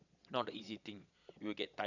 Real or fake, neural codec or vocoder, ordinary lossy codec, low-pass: fake; vocoder, 22.05 kHz, 80 mel bands, Vocos; none; 7.2 kHz